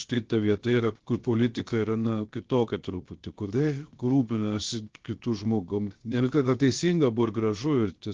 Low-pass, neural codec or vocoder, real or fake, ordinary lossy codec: 7.2 kHz; codec, 16 kHz, 0.7 kbps, FocalCodec; fake; Opus, 16 kbps